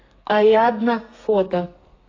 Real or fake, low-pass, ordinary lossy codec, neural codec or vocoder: fake; 7.2 kHz; AAC, 32 kbps; codec, 32 kHz, 1.9 kbps, SNAC